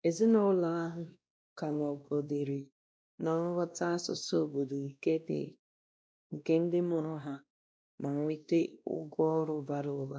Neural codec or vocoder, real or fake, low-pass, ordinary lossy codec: codec, 16 kHz, 1 kbps, X-Codec, WavLM features, trained on Multilingual LibriSpeech; fake; none; none